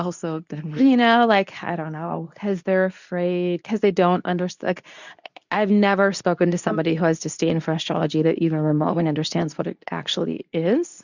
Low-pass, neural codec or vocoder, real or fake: 7.2 kHz; codec, 24 kHz, 0.9 kbps, WavTokenizer, medium speech release version 2; fake